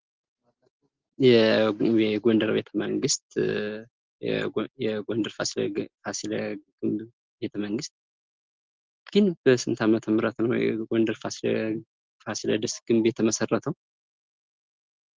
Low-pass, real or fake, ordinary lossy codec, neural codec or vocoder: 7.2 kHz; real; Opus, 16 kbps; none